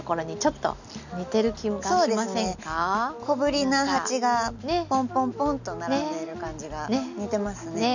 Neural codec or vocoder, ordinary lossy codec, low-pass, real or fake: none; none; 7.2 kHz; real